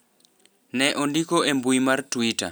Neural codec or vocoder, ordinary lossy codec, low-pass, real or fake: none; none; none; real